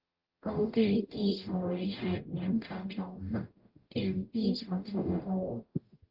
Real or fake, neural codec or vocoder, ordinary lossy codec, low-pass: fake; codec, 44.1 kHz, 0.9 kbps, DAC; Opus, 24 kbps; 5.4 kHz